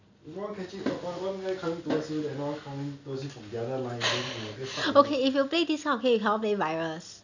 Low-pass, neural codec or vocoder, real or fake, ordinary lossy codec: 7.2 kHz; none; real; none